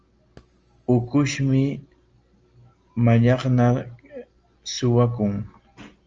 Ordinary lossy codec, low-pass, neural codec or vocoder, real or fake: Opus, 24 kbps; 7.2 kHz; none; real